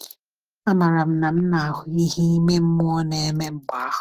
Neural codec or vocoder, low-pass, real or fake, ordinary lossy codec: autoencoder, 48 kHz, 128 numbers a frame, DAC-VAE, trained on Japanese speech; 14.4 kHz; fake; Opus, 24 kbps